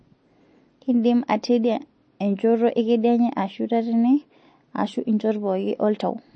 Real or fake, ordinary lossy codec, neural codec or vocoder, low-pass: real; MP3, 32 kbps; none; 9.9 kHz